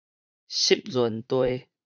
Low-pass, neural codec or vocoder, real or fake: 7.2 kHz; vocoder, 44.1 kHz, 80 mel bands, Vocos; fake